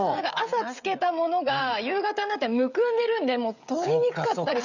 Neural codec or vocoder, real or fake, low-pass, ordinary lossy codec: codec, 16 kHz, 16 kbps, FreqCodec, smaller model; fake; 7.2 kHz; none